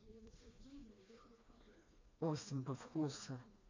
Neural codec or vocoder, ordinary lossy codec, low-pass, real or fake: codec, 16 kHz, 2 kbps, FreqCodec, smaller model; MP3, 64 kbps; 7.2 kHz; fake